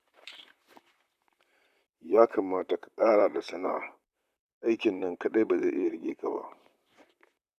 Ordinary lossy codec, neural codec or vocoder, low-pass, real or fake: none; vocoder, 44.1 kHz, 128 mel bands, Pupu-Vocoder; 14.4 kHz; fake